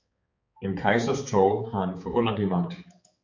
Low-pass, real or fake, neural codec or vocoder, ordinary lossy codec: 7.2 kHz; fake; codec, 16 kHz, 4 kbps, X-Codec, HuBERT features, trained on balanced general audio; MP3, 48 kbps